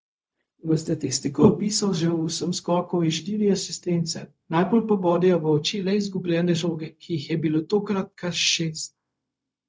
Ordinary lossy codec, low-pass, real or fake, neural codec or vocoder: none; none; fake; codec, 16 kHz, 0.4 kbps, LongCat-Audio-Codec